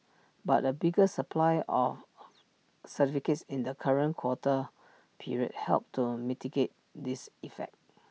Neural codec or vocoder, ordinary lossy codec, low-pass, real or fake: none; none; none; real